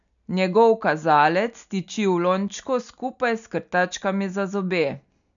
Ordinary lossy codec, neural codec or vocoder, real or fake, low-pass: none; none; real; 7.2 kHz